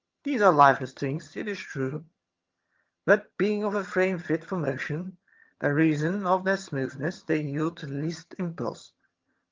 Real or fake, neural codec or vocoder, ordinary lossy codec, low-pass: fake; vocoder, 22.05 kHz, 80 mel bands, HiFi-GAN; Opus, 32 kbps; 7.2 kHz